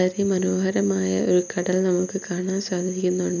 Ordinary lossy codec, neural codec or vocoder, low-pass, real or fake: none; none; 7.2 kHz; real